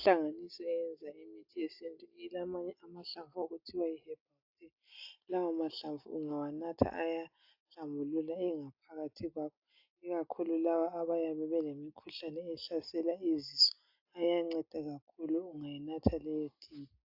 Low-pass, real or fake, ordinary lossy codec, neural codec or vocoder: 5.4 kHz; real; AAC, 48 kbps; none